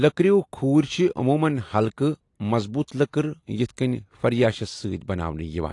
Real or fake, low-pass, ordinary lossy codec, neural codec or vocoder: real; 10.8 kHz; AAC, 48 kbps; none